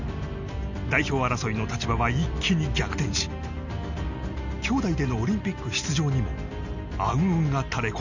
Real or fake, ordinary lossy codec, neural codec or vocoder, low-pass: real; none; none; 7.2 kHz